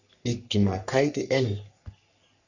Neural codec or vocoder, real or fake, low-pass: codec, 44.1 kHz, 3.4 kbps, Pupu-Codec; fake; 7.2 kHz